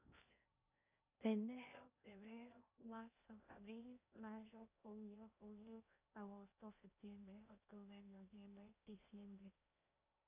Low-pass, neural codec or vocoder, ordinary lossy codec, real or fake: 3.6 kHz; codec, 16 kHz in and 24 kHz out, 0.6 kbps, FocalCodec, streaming, 2048 codes; none; fake